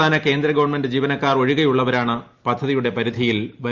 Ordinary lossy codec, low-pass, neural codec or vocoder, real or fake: Opus, 32 kbps; 7.2 kHz; none; real